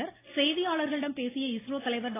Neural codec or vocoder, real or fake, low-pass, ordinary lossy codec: none; real; 3.6 kHz; AAC, 16 kbps